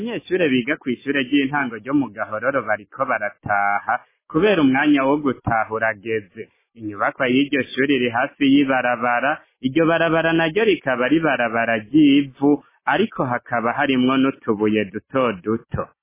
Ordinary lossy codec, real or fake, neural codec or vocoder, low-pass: MP3, 16 kbps; real; none; 3.6 kHz